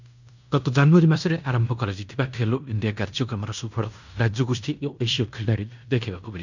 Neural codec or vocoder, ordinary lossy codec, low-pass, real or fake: codec, 16 kHz in and 24 kHz out, 0.9 kbps, LongCat-Audio-Codec, fine tuned four codebook decoder; none; 7.2 kHz; fake